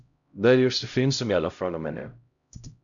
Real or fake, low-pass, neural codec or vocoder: fake; 7.2 kHz; codec, 16 kHz, 0.5 kbps, X-Codec, HuBERT features, trained on LibriSpeech